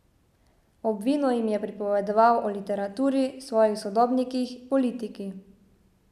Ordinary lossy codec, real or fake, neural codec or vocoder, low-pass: none; real; none; 14.4 kHz